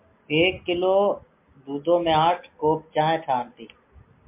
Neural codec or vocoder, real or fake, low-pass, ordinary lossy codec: none; real; 3.6 kHz; MP3, 32 kbps